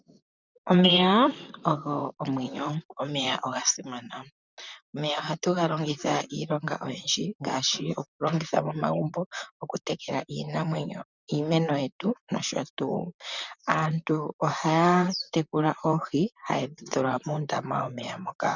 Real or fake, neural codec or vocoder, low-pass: fake; vocoder, 44.1 kHz, 128 mel bands, Pupu-Vocoder; 7.2 kHz